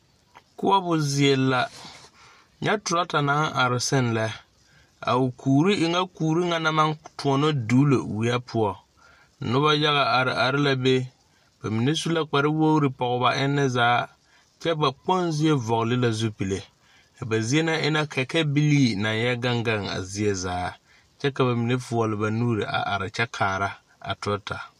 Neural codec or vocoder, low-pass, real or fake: none; 14.4 kHz; real